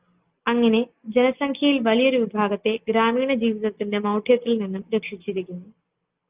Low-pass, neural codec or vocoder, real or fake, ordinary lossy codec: 3.6 kHz; none; real; Opus, 24 kbps